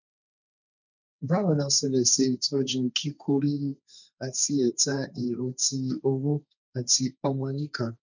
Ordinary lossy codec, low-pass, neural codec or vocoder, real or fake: none; 7.2 kHz; codec, 16 kHz, 1.1 kbps, Voila-Tokenizer; fake